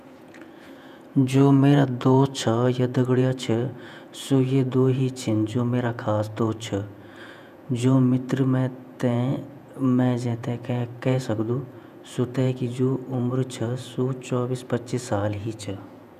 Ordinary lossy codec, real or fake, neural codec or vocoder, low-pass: none; fake; vocoder, 48 kHz, 128 mel bands, Vocos; 14.4 kHz